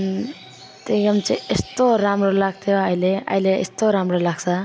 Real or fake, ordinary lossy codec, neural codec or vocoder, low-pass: real; none; none; none